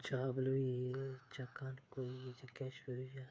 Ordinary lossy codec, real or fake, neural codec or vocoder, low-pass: none; fake; codec, 16 kHz, 8 kbps, FreqCodec, smaller model; none